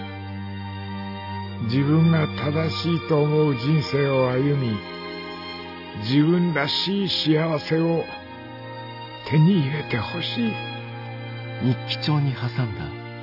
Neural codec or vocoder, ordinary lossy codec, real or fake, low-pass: none; AAC, 48 kbps; real; 5.4 kHz